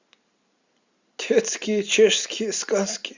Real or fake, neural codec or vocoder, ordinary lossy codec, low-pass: real; none; Opus, 64 kbps; 7.2 kHz